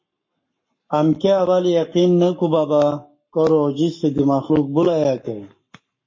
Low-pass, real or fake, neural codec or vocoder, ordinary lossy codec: 7.2 kHz; fake; codec, 44.1 kHz, 7.8 kbps, Pupu-Codec; MP3, 32 kbps